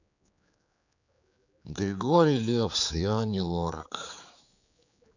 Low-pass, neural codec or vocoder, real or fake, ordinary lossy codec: 7.2 kHz; codec, 16 kHz, 4 kbps, X-Codec, HuBERT features, trained on general audio; fake; none